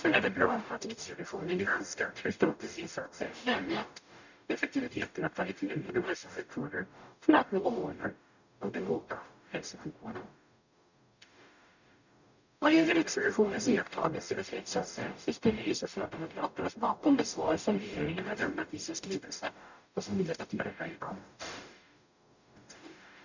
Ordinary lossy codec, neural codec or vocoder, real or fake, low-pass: none; codec, 44.1 kHz, 0.9 kbps, DAC; fake; 7.2 kHz